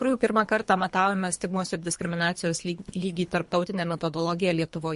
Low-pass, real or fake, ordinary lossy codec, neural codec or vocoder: 10.8 kHz; fake; MP3, 48 kbps; codec, 24 kHz, 3 kbps, HILCodec